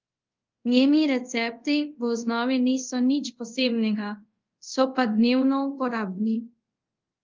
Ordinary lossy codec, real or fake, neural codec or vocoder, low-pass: Opus, 24 kbps; fake; codec, 24 kHz, 0.5 kbps, DualCodec; 7.2 kHz